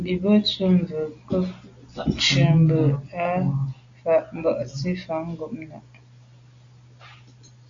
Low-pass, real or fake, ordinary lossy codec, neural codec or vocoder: 7.2 kHz; real; AAC, 48 kbps; none